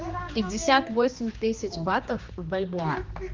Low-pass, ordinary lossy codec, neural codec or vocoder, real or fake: 7.2 kHz; Opus, 32 kbps; codec, 16 kHz, 2 kbps, X-Codec, HuBERT features, trained on general audio; fake